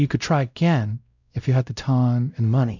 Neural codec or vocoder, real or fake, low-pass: codec, 16 kHz, 0.5 kbps, X-Codec, WavLM features, trained on Multilingual LibriSpeech; fake; 7.2 kHz